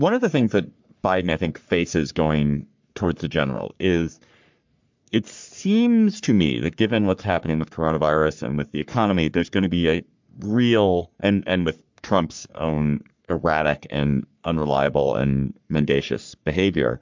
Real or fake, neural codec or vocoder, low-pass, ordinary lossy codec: fake; codec, 44.1 kHz, 3.4 kbps, Pupu-Codec; 7.2 kHz; MP3, 64 kbps